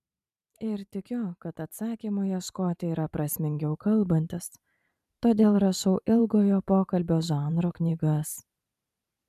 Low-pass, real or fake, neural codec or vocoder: 14.4 kHz; fake; vocoder, 44.1 kHz, 128 mel bands every 512 samples, BigVGAN v2